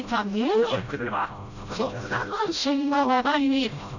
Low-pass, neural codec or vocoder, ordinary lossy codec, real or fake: 7.2 kHz; codec, 16 kHz, 0.5 kbps, FreqCodec, smaller model; none; fake